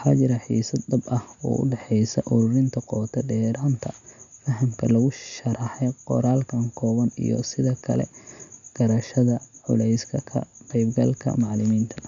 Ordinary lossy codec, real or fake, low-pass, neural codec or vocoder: none; real; 7.2 kHz; none